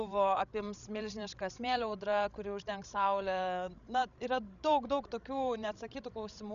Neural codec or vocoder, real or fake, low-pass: codec, 16 kHz, 16 kbps, FreqCodec, larger model; fake; 7.2 kHz